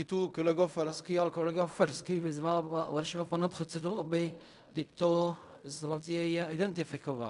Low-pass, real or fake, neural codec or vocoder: 10.8 kHz; fake; codec, 16 kHz in and 24 kHz out, 0.4 kbps, LongCat-Audio-Codec, fine tuned four codebook decoder